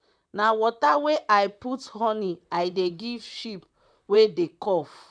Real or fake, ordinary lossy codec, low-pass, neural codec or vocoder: fake; none; 9.9 kHz; vocoder, 44.1 kHz, 128 mel bands, Pupu-Vocoder